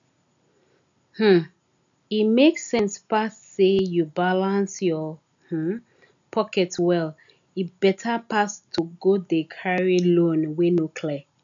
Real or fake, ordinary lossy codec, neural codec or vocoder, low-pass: real; none; none; 7.2 kHz